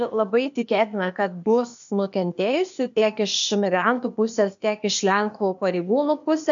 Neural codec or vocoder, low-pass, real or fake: codec, 16 kHz, 0.8 kbps, ZipCodec; 7.2 kHz; fake